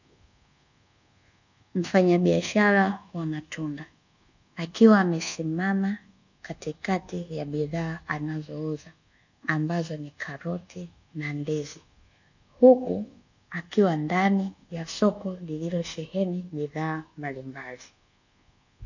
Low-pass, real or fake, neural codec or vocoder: 7.2 kHz; fake; codec, 24 kHz, 1.2 kbps, DualCodec